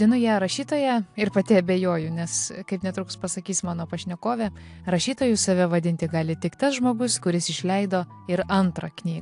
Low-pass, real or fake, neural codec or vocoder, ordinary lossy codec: 10.8 kHz; real; none; AAC, 64 kbps